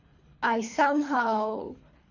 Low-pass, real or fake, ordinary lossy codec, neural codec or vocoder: 7.2 kHz; fake; none; codec, 24 kHz, 3 kbps, HILCodec